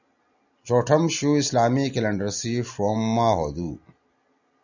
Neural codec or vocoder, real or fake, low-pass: none; real; 7.2 kHz